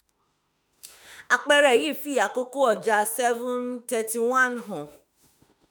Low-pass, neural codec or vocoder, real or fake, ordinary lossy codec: none; autoencoder, 48 kHz, 32 numbers a frame, DAC-VAE, trained on Japanese speech; fake; none